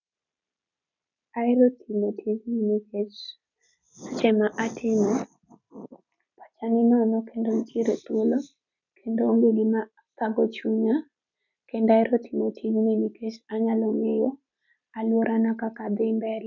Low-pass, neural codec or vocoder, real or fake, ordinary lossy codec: 7.2 kHz; none; real; none